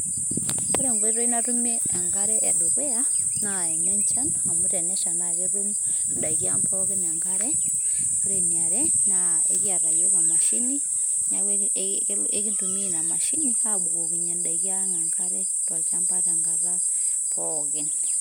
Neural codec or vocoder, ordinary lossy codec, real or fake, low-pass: none; none; real; none